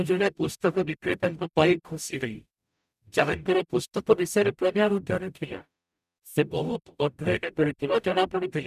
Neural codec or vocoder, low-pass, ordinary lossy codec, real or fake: codec, 44.1 kHz, 0.9 kbps, DAC; 14.4 kHz; none; fake